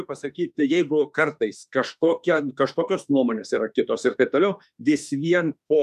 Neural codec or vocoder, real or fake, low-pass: autoencoder, 48 kHz, 32 numbers a frame, DAC-VAE, trained on Japanese speech; fake; 14.4 kHz